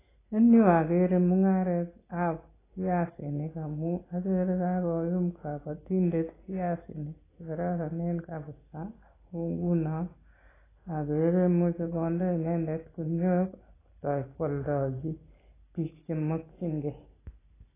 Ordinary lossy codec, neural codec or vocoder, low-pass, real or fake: AAC, 16 kbps; none; 3.6 kHz; real